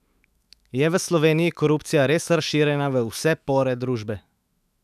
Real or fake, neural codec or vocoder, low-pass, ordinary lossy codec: fake; autoencoder, 48 kHz, 128 numbers a frame, DAC-VAE, trained on Japanese speech; 14.4 kHz; none